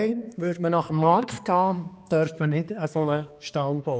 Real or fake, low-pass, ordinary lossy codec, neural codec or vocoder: fake; none; none; codec, 16 kHz, 2 kbps, X-Codec, HuBERT features, trained on balanced general audio